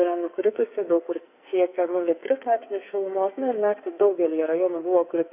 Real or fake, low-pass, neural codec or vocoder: fake; 3.6 kHz; codec, 44.1 kHz, 2.6 kbps, SNAC